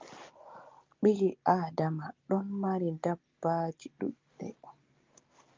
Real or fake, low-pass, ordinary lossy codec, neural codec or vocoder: real; 7.2 kHz; Opus, 24 kbps; none